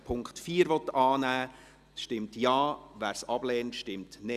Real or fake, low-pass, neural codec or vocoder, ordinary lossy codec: real; 14.4 kHz; none; Opus, 64 kbps